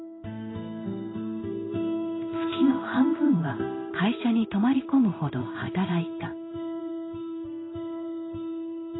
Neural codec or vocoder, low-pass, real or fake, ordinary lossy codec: codec, 16 kHz in and 24 kHz out, 1 kbps, XY-Tokenizer; 7.2 kHz; fake; AAC, 16 kbps